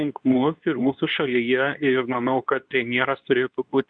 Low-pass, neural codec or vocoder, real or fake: 9.9 kHz; codec, 24 kHz, 0.9 kbps, WavTokenizer, medium speech release version 2; fake